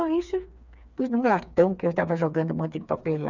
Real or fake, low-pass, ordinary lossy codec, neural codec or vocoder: fake; 7.2 kHz; none; codec, 16 kHz, 4 kbps, FreqCodec, smaller model